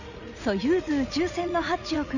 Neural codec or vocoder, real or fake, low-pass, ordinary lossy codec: vocoder, 44.1 kHz, 80 mel bands, Vocos; fake; 7.2 kHz; none